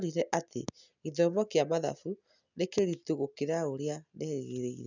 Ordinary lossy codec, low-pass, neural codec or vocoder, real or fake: none; 7.2 kHz; none; real